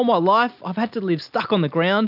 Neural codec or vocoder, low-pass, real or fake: none; 5.4 kHz; real